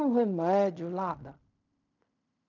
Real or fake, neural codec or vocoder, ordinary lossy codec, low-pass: fake; codec, 16 kHz in and 24 kHz out, 0.4 kbps, LongCat-Audio-Codec, fine tuned four codebook decoder; none; 7.2 kHz